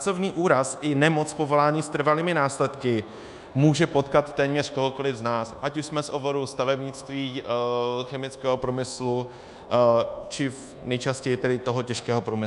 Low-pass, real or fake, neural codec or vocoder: 10.8 kHz; fake; codec, 24 kHz, 1.2 kbps, DualCodec